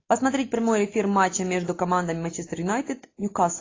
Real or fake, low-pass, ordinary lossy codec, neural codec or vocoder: real; 7.2 kHz; AAC, 32 kbps; none